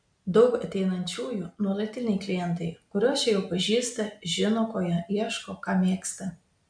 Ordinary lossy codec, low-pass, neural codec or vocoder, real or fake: MP3, 64 kbps; 9.9 kHz; none; real